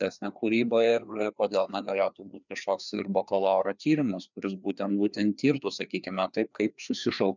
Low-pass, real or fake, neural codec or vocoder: 7.2 kHz; fake; codec, 16 kHz, 2 kbps, FreqCodec, larger model